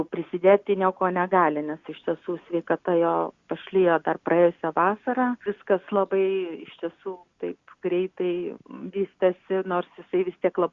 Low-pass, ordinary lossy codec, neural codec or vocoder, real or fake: 7.2 kHz; Opus, 64 kbps; none; real